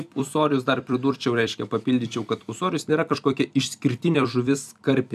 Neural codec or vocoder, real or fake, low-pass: vocoder, 44.1 kHz, 128 mel bands every 256 samples, BigVGAN v2; fake; 14.4 kHz